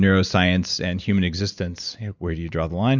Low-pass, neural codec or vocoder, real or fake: 7.2 kHz; none; real